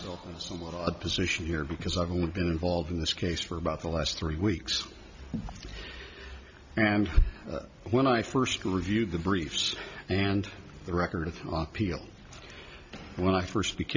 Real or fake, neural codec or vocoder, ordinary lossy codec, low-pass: real; none; MP3, 64 kbps; 7.2 kHz